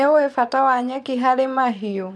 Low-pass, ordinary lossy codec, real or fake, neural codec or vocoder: none; none; fake; vocoder, 22.05 kHz, 80 mel bands, Vocos